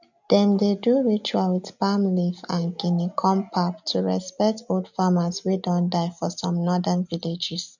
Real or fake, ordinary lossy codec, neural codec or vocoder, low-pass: real; none; none; 7.2 kHz